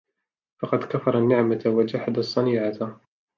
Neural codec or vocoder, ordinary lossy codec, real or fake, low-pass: none; MP3, 64 kbps; real; 7.2 kHz